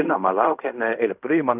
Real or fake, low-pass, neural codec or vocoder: fake; 3.6 kHz; codec, 16 kHz in and 24 kHz out, 0.4 kbps, LongCat-Audio-Codec, fine tuned four codebook decoder